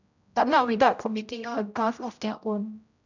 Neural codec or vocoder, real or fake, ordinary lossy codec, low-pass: codec, 16 kHz, 0.5 kbps, X-Codec, HuBERT features, trained on general audio; fake; none; 7.2 kHz